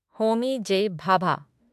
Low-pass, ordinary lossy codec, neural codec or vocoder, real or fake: 14.4 kHz; AAC, 96 kbps; autoencoder, 48 kHz, 32 numbers a frame, DAC-VAE, trained on Japanese speech; fake